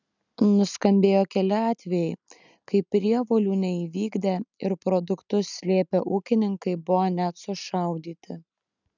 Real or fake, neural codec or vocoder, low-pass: real; none; 7.2 kHz